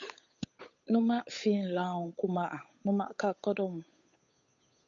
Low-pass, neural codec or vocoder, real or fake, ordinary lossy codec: 7.2 kHz; codec, 16 kHz, 8 kbps, FunCodec, trained on Chinese and English, 25 frames a second; fake; MP3, 48 kbps